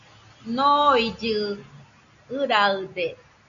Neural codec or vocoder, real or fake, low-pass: none; real; 7.2 kHz